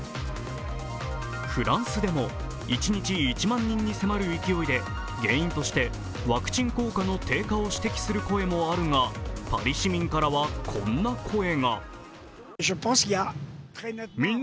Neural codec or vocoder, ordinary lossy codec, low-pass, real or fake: none; none; none; real